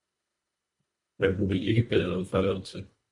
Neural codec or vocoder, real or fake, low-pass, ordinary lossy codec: codec, 24 kHz, 1.5 kbps, HILCodec; fake; 10.8 kHz; MP3, 48 kbps